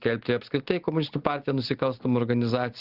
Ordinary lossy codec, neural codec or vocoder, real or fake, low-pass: Opus, 16 kbps; none; real; 5.4 kHz